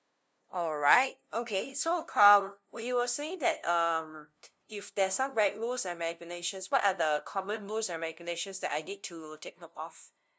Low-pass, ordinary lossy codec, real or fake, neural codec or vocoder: none; none; fake; codec, 16 kHz, 0.5 kbps, FunCodec, trained on LibriTTS, 25 frames a second